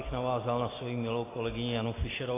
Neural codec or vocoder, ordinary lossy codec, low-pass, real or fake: none; AAC, 16 kbps; 3.6 kHz; real